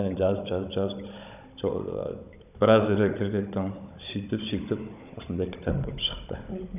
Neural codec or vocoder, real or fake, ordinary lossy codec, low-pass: codec, 16 kHz, 16 kbps, FunCodec, trained on Chinese and English, 50 frames a second; fake; none; 3.6 kHz